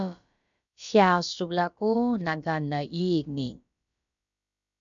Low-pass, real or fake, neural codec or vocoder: 7.2 kHz; fake; codec, 16 kHz, about 1 kbps, DyCAST, with the encoder's durations